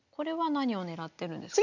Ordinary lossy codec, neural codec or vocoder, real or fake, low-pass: none; none; real; 7.2 kHz